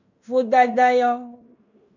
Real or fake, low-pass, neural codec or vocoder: fake; 7.2 kHz; codec, 16 kHz in and 24 kHz out, 0.9 kbps, LongCat-Audio-Codec, fine tuned four codebook decoder